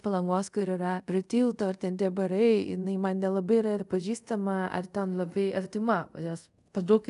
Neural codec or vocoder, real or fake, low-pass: codec, 24 kHz, 0.5 kbps, DualCodec; fake; 10.8 kHz